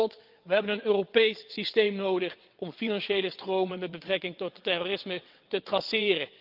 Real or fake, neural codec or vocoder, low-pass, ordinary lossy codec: fake; vocoder, 22.05 kHz, 80 mel bands, WaveNeXt; 5.4 kHz; Opus, 24 kbps